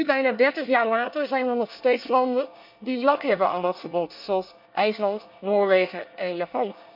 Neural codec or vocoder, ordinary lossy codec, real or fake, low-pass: codec, 24 kHz, 1 kbps, SNAC; none; fake; 5.4 kHz